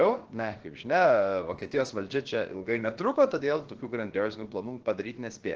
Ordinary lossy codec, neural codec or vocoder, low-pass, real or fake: Opus, 16 kbps; codec, 16 kHz, about 1 kbps, DyCAST, with the encoder's durations; 7.2 kHz; fake